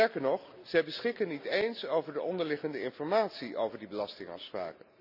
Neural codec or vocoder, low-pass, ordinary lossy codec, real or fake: none; 5.4 kHz; none; real